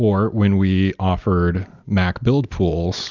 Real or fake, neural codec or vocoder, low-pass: real; none; 7.2 kHz